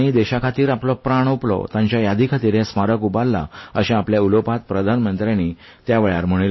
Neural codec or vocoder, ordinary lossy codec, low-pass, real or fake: none; MP3, 24 kbps; 7.2 kHz; real